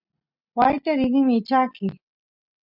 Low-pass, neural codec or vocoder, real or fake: 5.4 kHz; none; real